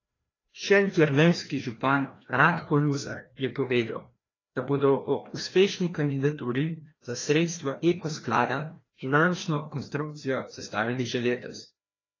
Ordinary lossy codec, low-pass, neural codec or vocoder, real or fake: AAC, 32 kbps; 7.2 kHz; codec, 16 kHz, 1 kbps, FreqCodec, larger model; fake